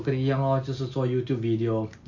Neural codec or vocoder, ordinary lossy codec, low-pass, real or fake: none; none; 7.2 kHz; real